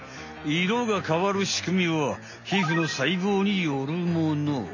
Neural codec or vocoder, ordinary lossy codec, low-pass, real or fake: none; AAC, 48 kbps; 7.2 kHz; real